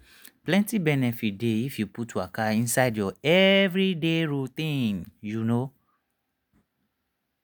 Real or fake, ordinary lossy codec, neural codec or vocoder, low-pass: real; none; none; none